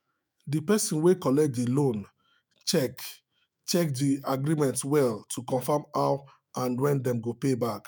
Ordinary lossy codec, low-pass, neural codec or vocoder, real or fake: none; none; autoencoder, 48 kHz, 128 numbers a frame, DAC-VAE, trained on Japanese speech; fake